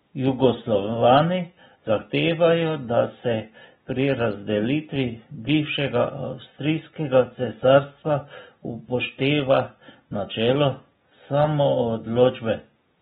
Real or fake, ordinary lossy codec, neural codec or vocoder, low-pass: real; AAC, 16 kbps; none; 14.4 kHz